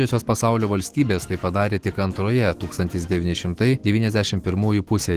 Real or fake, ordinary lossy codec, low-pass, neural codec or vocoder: fake; Opus, 16 kbps; 14.4 kHz; autoencoder, 48 kHz, 128 numbers a frame, DAC-VAE, trained on Japanese speech